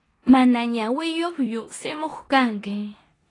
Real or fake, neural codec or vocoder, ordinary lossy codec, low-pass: fake; codec, 16 kHz in and 24 kHz out, 0.9 kbps, LongCat-Audio-Codec, four codebook decoder; AAC, 32 kbps; 10.8 kHz